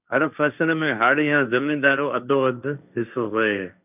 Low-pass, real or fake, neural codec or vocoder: 3.6 kHz; fake; codec, 16 kHz, 1.1 kbps, Voila-Tokenizer